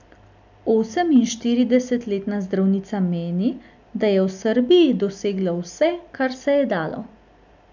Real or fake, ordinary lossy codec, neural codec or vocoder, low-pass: real; none; none; 7.2 kHz